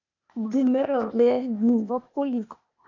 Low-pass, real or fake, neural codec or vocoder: 7.2 kHz; fake; codec, 16 kHz, 0.8 kbps, ZipCodec